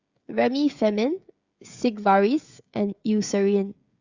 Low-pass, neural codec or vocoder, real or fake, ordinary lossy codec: 7.2 kHz; codec, 16 kHz, 16 kbps, FreqCodec, smaller model; fake; Opus, 64 kbps